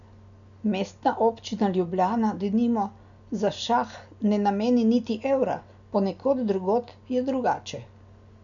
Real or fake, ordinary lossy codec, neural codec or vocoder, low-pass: real; none; none; 7.2 kHz